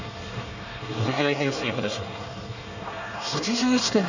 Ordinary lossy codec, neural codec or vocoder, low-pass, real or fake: none; codec, 24 kHz, 1 kbps, SNAC; 7.2 kHz; fake